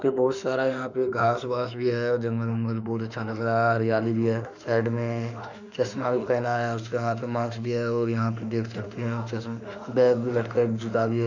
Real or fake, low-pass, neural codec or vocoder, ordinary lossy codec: fake; 7.2 kHz; autoencoder, 48 kHz, 32 numbers a frame, DAC-VAE, trained on Japanese speech; none